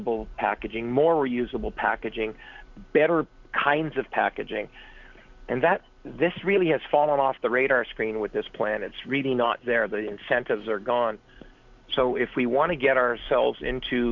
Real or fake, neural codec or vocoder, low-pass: real; none; 7.2 kHz